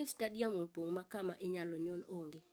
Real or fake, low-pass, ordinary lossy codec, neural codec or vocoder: fake; none; none; codec, 44.1 kHz, 7.8 kbps, DAC